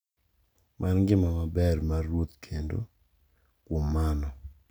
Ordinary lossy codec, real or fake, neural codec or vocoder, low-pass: none; real; none; none